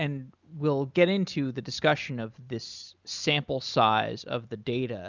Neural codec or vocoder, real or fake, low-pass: none; real; 7.2 kHz